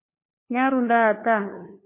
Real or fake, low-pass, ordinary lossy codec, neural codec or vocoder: fake; 3.6 kHz; MP3, 24 kbps; codec, 16 kHz, 2 kbps, FunCodec, trained on LibriTTS, 25 frames a second